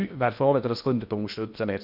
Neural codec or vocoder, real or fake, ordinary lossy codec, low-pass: codec, 16 kHz in and 24 kHz out, 0.8 kbps, FocalCodec, streaming, 65536 codes; fake; none; 5.4 kHz